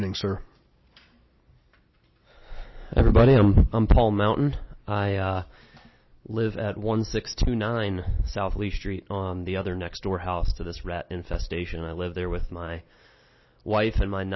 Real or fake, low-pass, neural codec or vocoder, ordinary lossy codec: real; 7.2 kHz; none; MP3, 24 kbps